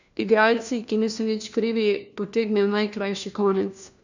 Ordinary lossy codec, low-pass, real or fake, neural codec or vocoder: none; 7.2 kHz; fake; codec, 16 kHz, 1 kbps, FunCodec, trained on LibriTTS, 50 frames a second